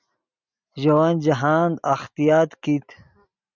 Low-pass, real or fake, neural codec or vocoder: 7.2 kHz; real; none